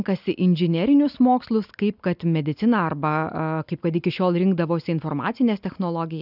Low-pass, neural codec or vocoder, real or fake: 5.4 kHz; none; real